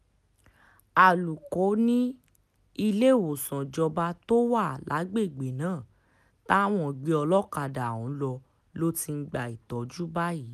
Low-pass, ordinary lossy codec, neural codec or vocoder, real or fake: 14.4 kHz; none; none; real